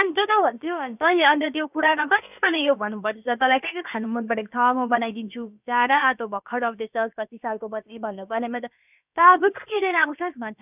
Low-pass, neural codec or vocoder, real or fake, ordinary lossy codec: 3.6 kHz; codec, 16 kHz, about 1 kbps, DyCAST, with the encoder's durations; fake; none